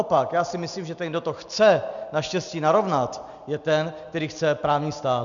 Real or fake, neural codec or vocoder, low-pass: real; none; 7.2 kHz